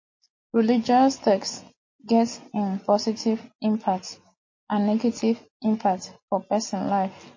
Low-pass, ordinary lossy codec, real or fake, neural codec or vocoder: 7.2 kHz; MP3, 32 kbps; real; none